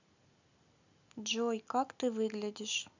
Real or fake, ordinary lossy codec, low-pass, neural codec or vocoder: real; none; 7.2 kHz; none